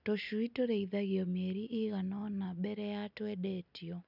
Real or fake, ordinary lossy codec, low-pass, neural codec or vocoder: real; none; 5.4 kHz; none